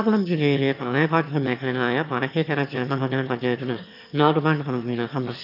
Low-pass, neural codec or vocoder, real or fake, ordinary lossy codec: 5.4 kHz; autoencoder, 22.05 kHz, a latent of 192 numbers a frame, VITS, trained on one speaker; fake; none